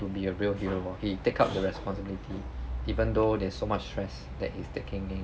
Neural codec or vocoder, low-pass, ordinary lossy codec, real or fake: none; none; none; real